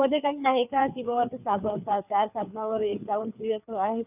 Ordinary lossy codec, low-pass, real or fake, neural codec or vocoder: none; 3.6 kHz; fake; codec, 16 kHz, 4 kbps, FreqCodec, larger model